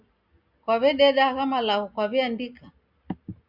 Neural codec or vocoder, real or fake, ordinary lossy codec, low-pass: none; real; Opus, 64 kbps; 5.4 kHz